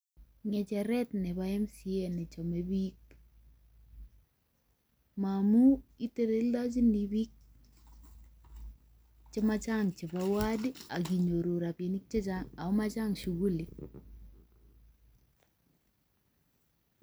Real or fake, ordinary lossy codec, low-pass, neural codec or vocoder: real; none; none; none